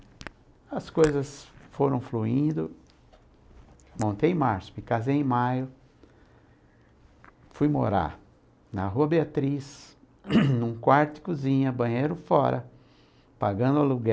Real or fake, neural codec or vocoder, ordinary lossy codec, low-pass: real; none; none; none